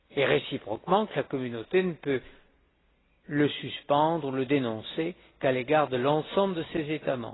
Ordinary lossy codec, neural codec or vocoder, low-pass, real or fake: AAC, 16 kbps; none; 7.2 kHz; real